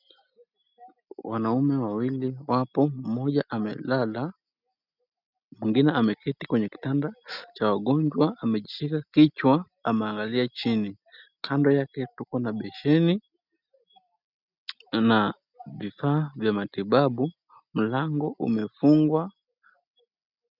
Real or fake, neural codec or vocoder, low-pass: real; none; 5.4 kHz